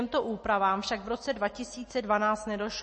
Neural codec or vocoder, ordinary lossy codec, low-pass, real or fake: none; MP3, 32 kbps; 10.8 kHz; real